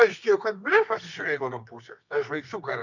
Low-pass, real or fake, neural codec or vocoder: 7.2 kHz; fake; codec, 24 kHz, 0.9 kbps, WavTokenizer, medium music audio release